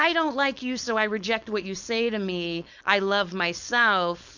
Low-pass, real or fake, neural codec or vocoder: 7.2 kHz; fake; codec, 16 kHz, 4.8 kbps, FACodec